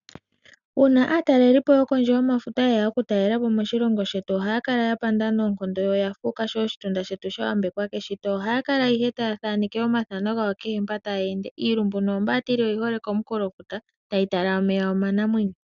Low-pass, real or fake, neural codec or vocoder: 7.2 kHz; real; none